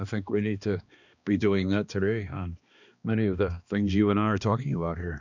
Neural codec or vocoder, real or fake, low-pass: codec, 16 kHz, 2 kbps, X-Codec, HuBERT features, trained on balanced general audio; fake; 7.2 kHz